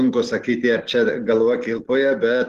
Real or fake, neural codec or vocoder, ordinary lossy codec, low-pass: real; none; Opus, 16 kbps; 14.4 kHz